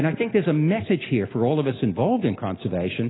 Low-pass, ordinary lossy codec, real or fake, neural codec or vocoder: 7.2 kHz; AAC, 16 kbps; real; none